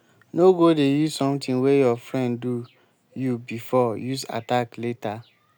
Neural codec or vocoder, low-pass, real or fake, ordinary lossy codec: none; none; real; none